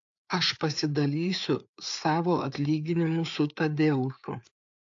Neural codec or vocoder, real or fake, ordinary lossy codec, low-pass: codec, 16 kHz, 4 kbps, FreqCodec, larger model; fake; AAC, 64 kbps; 7.2 kHz